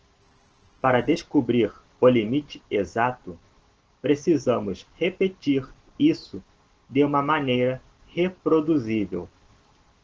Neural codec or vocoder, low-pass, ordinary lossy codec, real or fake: none; 7.2 kHz; Opus, 16 kbps; real